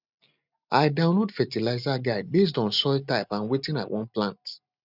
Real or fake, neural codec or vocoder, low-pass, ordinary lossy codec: real; none; 5.4 kHz; none